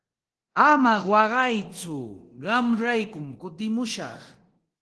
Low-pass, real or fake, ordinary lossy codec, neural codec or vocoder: 10.8 kHz; fake; Opus, 16 kbps; codec, 24 kHz, 0.9 kbps, DualCodec